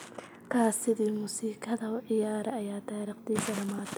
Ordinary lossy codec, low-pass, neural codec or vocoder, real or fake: none; none; none; real